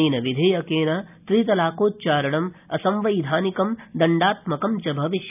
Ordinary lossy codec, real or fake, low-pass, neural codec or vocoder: none; real; 3.6 kHz; none